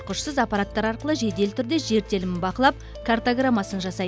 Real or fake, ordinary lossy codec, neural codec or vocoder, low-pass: real; none; none; none